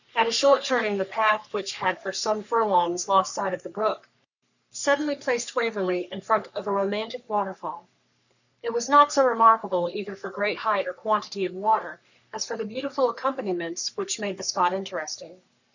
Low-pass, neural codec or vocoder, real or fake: 7.2 kHz; codec, 44.1 kHz, 3.4 kbps, Pupu-Codec; fake